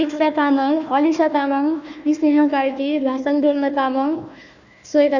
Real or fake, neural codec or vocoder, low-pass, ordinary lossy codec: fake; codec, 16 kHz, 1 kbps, FunCodec, trained on Chinese and English, 50 frames a second; 7.2 kHz; none